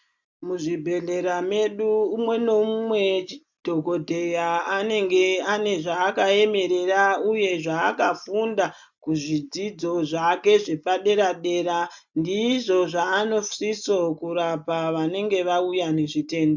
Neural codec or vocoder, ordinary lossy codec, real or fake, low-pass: none; MP3, 64 kbps; real; 7.2 kHz